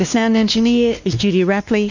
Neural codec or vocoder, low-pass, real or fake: codec, 16 kHz, 2 kbps, X-Codec, WavLM features, trained on Multilingual LibriSpeech; 7.2 kHz; fake